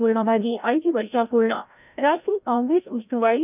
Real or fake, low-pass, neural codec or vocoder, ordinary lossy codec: fake; 3.6 kHz; codec, 16 kHz, 0.5 kbps, FreqCodec, larger model; none